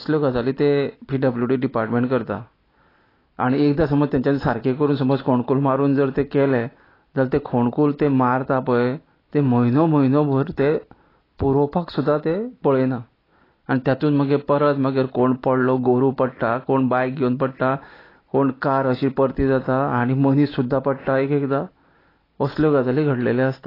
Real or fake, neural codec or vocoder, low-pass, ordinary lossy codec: real; none; 5.4 kHz; AAC, 24 kbps